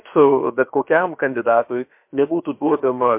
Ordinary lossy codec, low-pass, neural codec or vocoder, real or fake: MP3, 32 kbps; 3.6 kHz; codec, 16 kHz, about 1 kbps, DyCAST, with the encoder's durations; fake